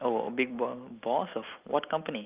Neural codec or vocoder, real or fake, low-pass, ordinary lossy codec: none; real; 3.6 kHz; Opus, 24 kbps